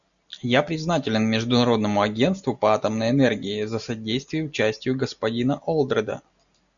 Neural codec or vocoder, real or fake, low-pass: none; real; 7.2 kHz